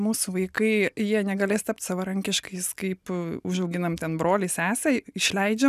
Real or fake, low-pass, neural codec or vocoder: real; 14.4 kHz; none